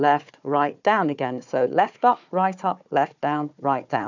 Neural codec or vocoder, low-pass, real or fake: codec, 16 kHz, 4 kbps, FunCodec, trained on Chinese and English, 50 frames a second; 7.2 kHz; fake